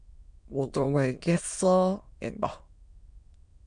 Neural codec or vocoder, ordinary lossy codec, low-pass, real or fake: autoencoder, 22.05 kHz, a latent of 192 numbers a frame, VITS, trained on many speakers; MP3, 64 kbps; 9.9 kHz; fake